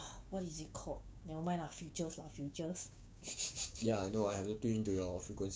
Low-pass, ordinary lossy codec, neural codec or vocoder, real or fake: none; none; codec, 16 kHz, 6 kbps, DAC; fake